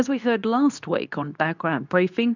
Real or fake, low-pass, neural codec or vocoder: fake; 7.2 kHz; codec, 24 kHz, 0.9 kbps, WavTokenizer, medium speech release version 2